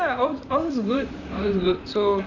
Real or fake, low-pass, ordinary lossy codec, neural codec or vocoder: fake; 7.2 kHz; none; vocoder, 44.1 kHz, 128 mel bands, Pupu-Vocoder